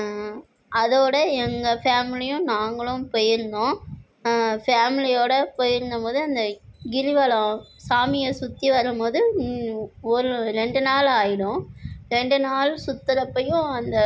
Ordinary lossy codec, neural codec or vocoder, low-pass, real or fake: none; none; none; real